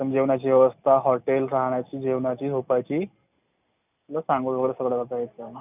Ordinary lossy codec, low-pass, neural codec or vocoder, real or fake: none; 3.6 kHz; none; real